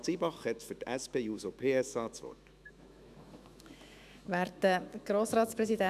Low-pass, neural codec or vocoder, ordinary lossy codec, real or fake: 14.4 kHz; autoencoder, 48 kHz, 128 numbers a frame, DAC-VAE, trained on Japanese speech; none; fake